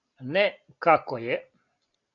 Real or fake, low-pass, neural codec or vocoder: real; 7.2 kHz; none